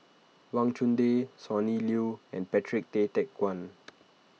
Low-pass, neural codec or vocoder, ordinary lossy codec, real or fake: none; none; none; real